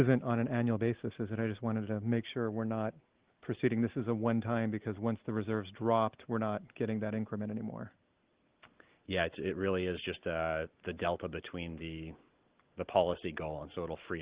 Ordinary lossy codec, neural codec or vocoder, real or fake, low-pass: Opus, 16 kbps; none; real; 3.6 kHz